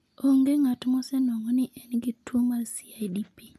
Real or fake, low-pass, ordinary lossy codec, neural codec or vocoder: real; 14.4 kHz; none; none